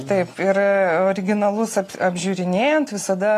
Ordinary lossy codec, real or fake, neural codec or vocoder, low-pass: AAC, 48 kbps; real; none; 14.4 kHz